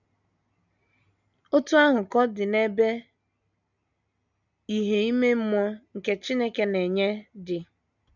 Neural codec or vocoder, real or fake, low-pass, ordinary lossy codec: none; real; 7.2 kHz; none